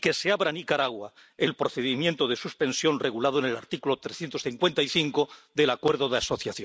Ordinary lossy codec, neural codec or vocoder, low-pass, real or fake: none; none; none; real